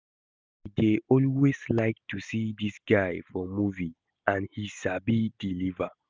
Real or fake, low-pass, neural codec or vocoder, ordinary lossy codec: real; none; none; none